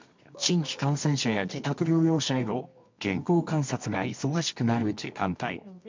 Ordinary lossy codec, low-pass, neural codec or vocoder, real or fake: MP3, 64 kbps; 7.2 kHz; codec, 24 kHz, 0.9 kbps, WavTokenizer, medium music audio release; fake